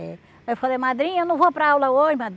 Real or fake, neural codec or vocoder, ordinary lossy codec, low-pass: real; none; none; none